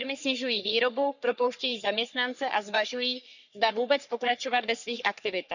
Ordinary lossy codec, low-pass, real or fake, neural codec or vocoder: none; 7.2 kHz; fake; codec, 44.1 kHz, 3.4 kbps, Pupu-Codec